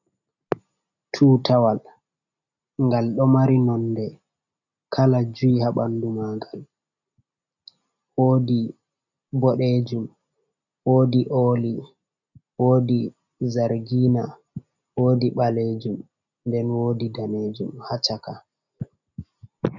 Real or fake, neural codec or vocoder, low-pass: real; none; 7.2 kHz